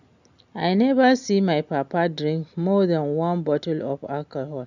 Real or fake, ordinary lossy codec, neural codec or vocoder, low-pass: real; none; none; 7.2 kHz